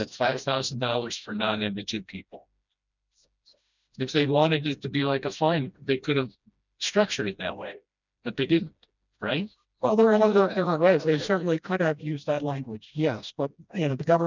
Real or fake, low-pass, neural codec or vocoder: fake; 7.2 kHz; codec, 16 kHz, 1 kbps, FreqCodec, smaller model